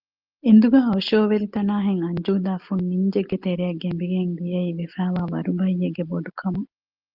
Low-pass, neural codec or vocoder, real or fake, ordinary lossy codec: 5.4 kHz; none; real; Opus, 32 kbps